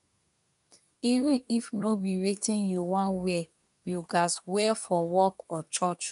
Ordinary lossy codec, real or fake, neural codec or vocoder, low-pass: none; fake; codec, 24 kHz, 1 kbps, SNAC; 10.8 kHz